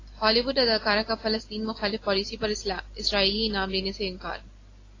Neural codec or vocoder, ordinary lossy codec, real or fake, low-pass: none; AAC, 32 kbps; real; 7.2 kHz